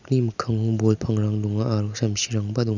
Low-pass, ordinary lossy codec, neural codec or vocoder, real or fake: 7.2 kHz; none; none; real